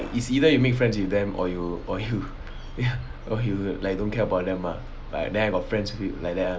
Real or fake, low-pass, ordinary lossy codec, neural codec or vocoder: real; none; none; none